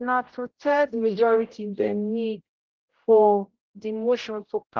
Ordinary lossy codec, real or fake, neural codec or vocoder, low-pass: Opus, 16 kbps; fake; codec, 16 kHz, 0.5 kbps, X-Codec, HuBERT features, trained on general audio; 7.2 kHz